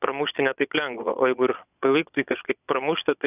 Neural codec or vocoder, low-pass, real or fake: vocoder, 22.05 kHz, 80 mel bands, Vocos; 3.6 kHz; fake